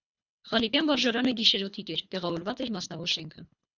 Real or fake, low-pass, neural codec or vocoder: fake; 7.2 kHz; codec, 24 kHz, 3 kbps, HILCodec